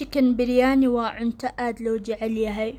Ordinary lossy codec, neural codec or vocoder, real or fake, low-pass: none; none; real; 19.8 kHz